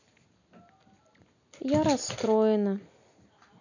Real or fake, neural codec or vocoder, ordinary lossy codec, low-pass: real; none; none; 7.2 kHz